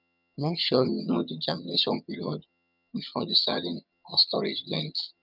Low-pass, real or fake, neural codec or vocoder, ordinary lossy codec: 5.4 kHz; fake; vocoder, 22.05 kHz, 80 mel bands, HiFi-GAN; none